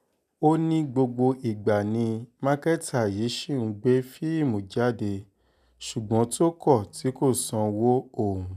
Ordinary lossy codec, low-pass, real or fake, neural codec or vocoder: none; 14.4 kHz; real; none